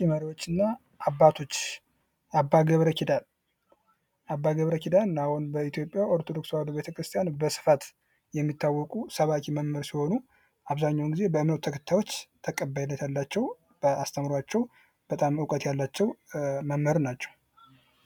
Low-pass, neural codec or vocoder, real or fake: 19.8 kHz; none; real